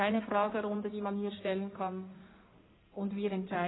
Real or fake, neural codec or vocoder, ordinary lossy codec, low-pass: fake; codec, 16 kHz in and 24 kHz out, 2.2 kbps, FireRedTTS-2 codec; AAC, 16 kbps; 7.2 kHz